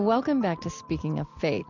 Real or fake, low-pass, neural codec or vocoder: real; 7.2 kHz; none